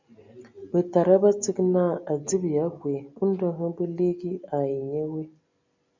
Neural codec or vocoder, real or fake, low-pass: none; real; 7.2 kHz